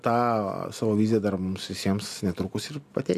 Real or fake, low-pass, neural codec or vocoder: real; 14.4 kHz; none